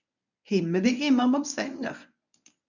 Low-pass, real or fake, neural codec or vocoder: 7.2 kHz; fake; codec, 24 kHz, 0.9 kbps, WavTokenizer, medium speech release version 1